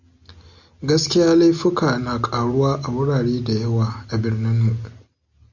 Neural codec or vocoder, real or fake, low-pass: none; real; 7.2 kHz